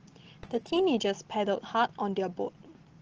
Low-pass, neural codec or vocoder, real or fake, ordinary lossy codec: 7.2 kHz; none; real; Opus, 16 kbps